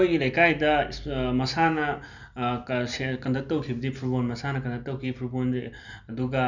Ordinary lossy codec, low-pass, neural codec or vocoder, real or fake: none; 7.2 kHz; none; real